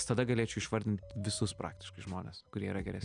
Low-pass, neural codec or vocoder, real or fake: 9.9 kHz; none; real